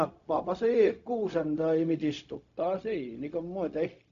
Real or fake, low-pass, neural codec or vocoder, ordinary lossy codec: fake; 7.2 kHz; codec, 16 kHz, 0.4 kbps, LongCat-Audio-Codec; none